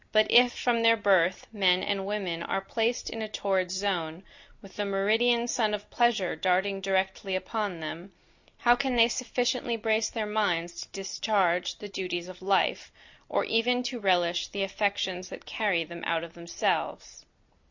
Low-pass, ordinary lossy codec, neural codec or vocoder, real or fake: 7.2 kHz; Opus, 64 kbps; none; real